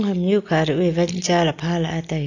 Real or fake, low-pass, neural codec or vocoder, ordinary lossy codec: real; 7.2 kHz; none; AAC, 48 kbps